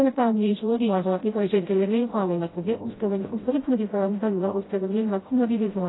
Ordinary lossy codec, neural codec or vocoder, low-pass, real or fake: AAC, 16 kbps; codec, 16 kHz, 0.5 kbps, FreqCodec, smaller model; 7.2 kHz; fake